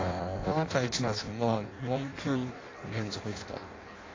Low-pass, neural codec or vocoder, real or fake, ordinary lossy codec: 7.2 kHz; codec, 16 kHz in and 24 kHz out, 0.6 kbps, FireRedTTS-2 codec; fake; AAC, 32 kbps